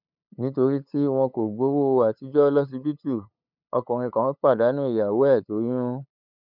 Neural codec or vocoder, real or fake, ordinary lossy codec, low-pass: codec, 16 kHz, 8 kbps, FunCodec, trained on LibriTTS, 25 frames a second; fake; none; 5.4 kHz